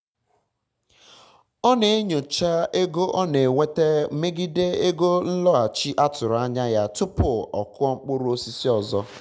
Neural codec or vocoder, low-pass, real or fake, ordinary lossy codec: none; none; real; none